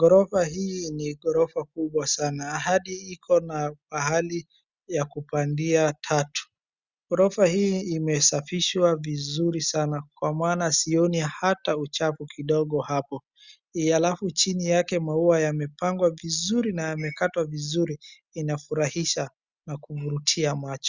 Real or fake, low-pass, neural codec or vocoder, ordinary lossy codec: real; 7.2 kHz; none; Opus, 64 kbps